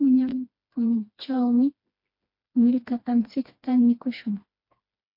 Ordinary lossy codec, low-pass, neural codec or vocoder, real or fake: MP3, 32 kbps; 5.4 kHz; codec, 16 kHz, 2 kbps, FreqCodec, smaller model; fake